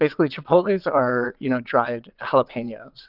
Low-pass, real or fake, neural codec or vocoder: 5.4 kHz; fake; vocoder, 22.05 kHz, 80 mel bands, WaveNeXt